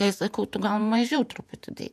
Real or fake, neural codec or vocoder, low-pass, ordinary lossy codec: fake; vocoder, 48 kHz, 128 mel bands, Vocos; 14.4 kHz; AAC, 96 kbps